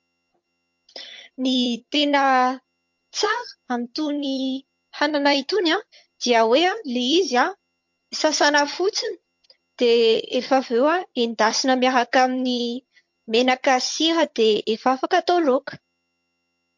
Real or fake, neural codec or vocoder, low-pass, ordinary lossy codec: fake; vocoder, 22.05 kHz, 80 mel bands, HiFi-GAN; 7.2 kHz; MP3, 48 kbps